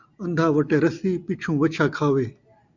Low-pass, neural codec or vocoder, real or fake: 7.2 kHz; none; real